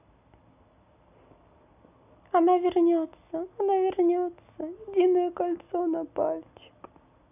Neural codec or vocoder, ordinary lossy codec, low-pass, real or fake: none; none; 3.6 kHz; real